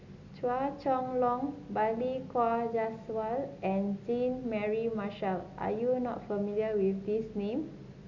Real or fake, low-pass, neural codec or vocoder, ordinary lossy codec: real; 7.2 kHz; none; MP3, 64 kbps